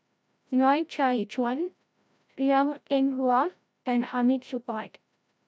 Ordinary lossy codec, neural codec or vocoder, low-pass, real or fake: none; codec, 16 kHz, 0.5 kbps, FreqCodec, larger model; none; fake